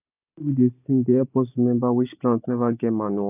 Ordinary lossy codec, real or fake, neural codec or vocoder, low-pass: none; real; none; 3.6 kHz